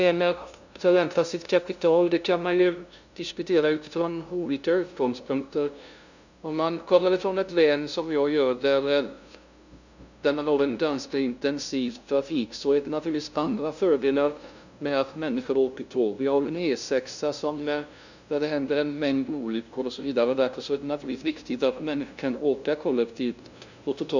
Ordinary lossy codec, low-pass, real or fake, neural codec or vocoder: none; 7.2 kHz; fake; codec, 16 kHz, 0.5 kbps, FunCodec, trained on LibriTTS, 25 frames a second